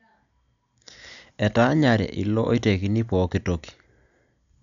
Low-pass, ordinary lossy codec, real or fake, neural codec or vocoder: 7.2 kHz; MP3, 96 kbps; real; none